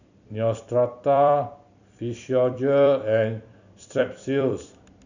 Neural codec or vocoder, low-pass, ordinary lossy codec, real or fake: vocoder, 44.1 kHz, 128 mel bands every 256 samples, BigVGAN v2; 7.2 kHz; none; fake